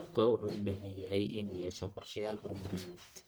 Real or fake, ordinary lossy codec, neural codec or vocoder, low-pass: fake; none; codec, 44.1 kHz, 1.7 kbps, Pupu-Codec; none